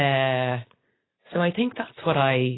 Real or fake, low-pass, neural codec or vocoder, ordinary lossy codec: real; 7.2 kHz; none; AAC, 16 kbps